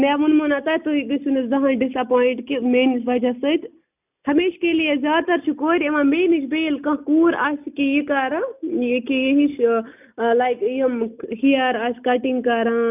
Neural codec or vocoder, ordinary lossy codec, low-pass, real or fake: none; none; 3.6 kHz; real